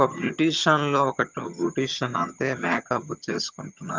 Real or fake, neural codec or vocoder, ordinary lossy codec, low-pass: fake; vocoder, 22.05 kHz, 80 mel bands, HiFi-GAN; Opus, 24 kbps; 7.2 kHz